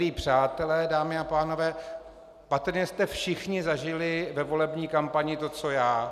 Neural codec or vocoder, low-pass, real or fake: none; 14.4 kHz; real